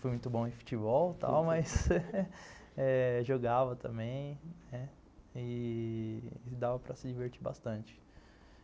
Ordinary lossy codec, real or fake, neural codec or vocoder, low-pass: none; real; none; none